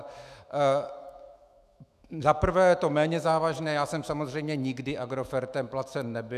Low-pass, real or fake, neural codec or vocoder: 14.4 kHz; fake; autoencoder, 48 kHz, 128 numbers a frame, DAC-VAE, trained on Japanese speech